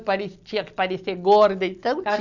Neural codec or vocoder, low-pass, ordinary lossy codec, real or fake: none; 7.2 kHz; none; real